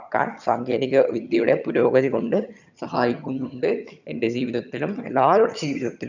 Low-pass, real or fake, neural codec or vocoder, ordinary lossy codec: 7.2 kHz; fake; vocoder, 22.05 kHz, 80 mel bands, HiFi-GAN; none